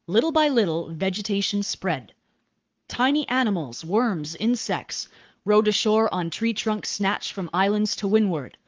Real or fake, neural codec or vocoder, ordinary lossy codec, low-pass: fake; codec, 44.1 kHz, 7.8 kbps, Pupu-Codec; Opus, 24 kbps; 7.2 kHz